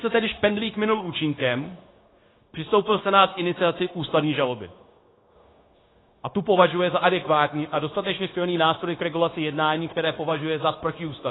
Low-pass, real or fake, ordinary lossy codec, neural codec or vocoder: 7.2 kHz; fake; AAC, 16 kbps; codec, 16 kHz, 0.9 kbps, LongCat-Audio-Codec